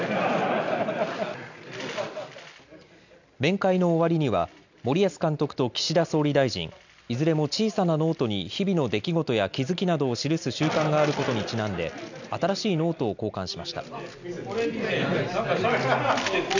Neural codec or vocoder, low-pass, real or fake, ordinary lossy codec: none; 7.2 kHz; real; none